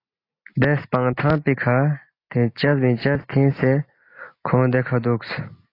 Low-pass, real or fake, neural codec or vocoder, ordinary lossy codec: 5.4 kHz; real; none; AAC, 32 kbps